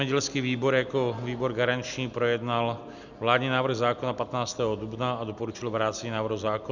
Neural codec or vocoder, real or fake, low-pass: none; real; 7.2 kHz